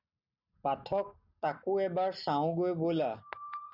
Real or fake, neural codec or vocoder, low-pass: real; none; 5.4 kHz